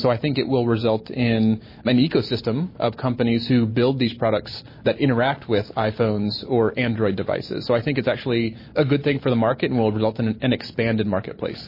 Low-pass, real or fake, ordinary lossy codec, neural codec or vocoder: 5.4 kHz; real; MP3, 24 kbps; none